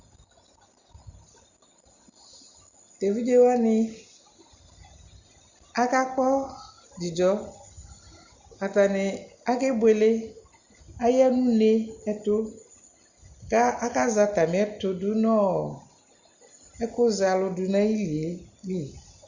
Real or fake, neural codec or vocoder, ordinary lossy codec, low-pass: real; none; Opus, 64 kbps; 7.2 kHz